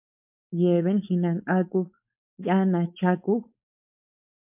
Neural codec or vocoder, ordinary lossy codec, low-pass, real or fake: codec, 16 kHz, 4.8 kbps, FACodec; MP3, 32 kbps; 3.6 kHz; fake